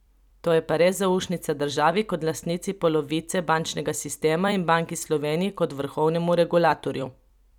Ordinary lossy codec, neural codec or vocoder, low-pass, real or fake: none; vocoder, 44.1 kHz, 128 mel bands every 256 samples, BigVGAN v2; 19.8 kHz; fake